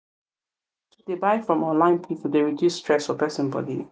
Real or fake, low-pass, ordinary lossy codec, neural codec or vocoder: real; none; none; none